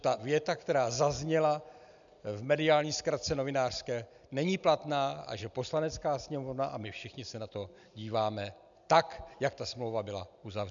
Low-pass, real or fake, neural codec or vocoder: 7.2 kHz; real; none